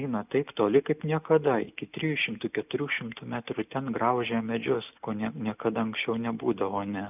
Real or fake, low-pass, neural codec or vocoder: real; 3.6 kHz; none